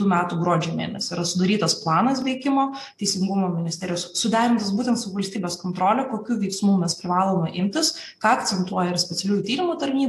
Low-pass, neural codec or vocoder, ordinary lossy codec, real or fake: 14.4 kHz; none; AAC, 64 kbps; real